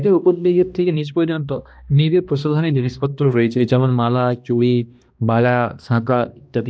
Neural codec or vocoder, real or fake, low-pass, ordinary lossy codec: codec, 16 kHz, 1 kbps, X-Codec, HuBERT features, trained on balanced general audio; fake; none; none